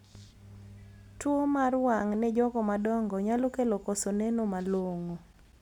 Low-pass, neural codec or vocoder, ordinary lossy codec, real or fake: 19.8 kHz; none; none; real